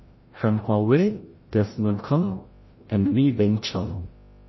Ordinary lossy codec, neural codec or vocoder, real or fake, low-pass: MP3, 24 kbps; codec, 16 kHz, 0.5 kbps, FreqCodec, larger model; fake; 7.2 kHz